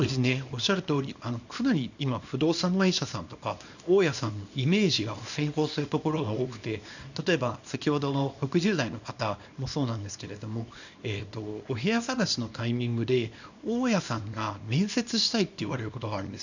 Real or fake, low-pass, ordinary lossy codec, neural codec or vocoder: fake; 7.2 kHz; none; codec, 24 kHz, 0.9 kbps, WavTokenizer, small release